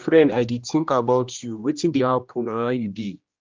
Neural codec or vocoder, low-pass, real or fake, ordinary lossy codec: codec, 16 kHz, 1 kbps, X-Codec, HuBERT features, trained on general audio; 7.2 kHz; fake; Opus, 24 kbps